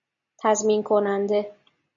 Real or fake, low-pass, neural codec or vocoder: real; 7.2 kHz; none